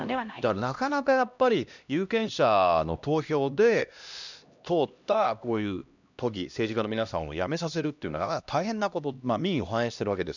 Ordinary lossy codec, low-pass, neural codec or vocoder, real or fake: none; 7.2 kHz; codec, 16 kHz, 1 kbps, X-Codec, HuBERT features, trained on LibriSpeech; fake